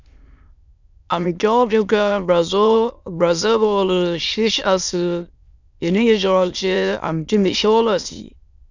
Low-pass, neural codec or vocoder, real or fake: 7.2 kHz; autoencoder, 22.05 kHz, a latent of 192 numbers a frame, VITS, trained on many speakers; fake